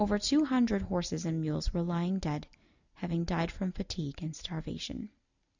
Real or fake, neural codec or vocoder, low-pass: real; none; 7.2 kHz